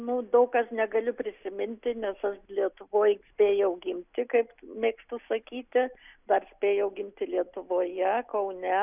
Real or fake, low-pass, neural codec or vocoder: real; 3.6 kHz; none